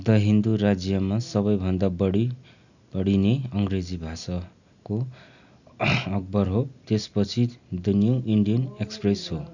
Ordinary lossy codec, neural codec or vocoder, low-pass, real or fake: none; none; 7.2 kHz; real